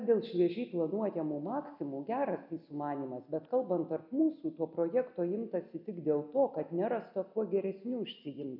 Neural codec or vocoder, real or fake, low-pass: none; real; 5.4 kHz